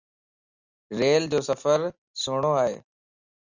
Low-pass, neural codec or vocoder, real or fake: 7.2 kHz; none; real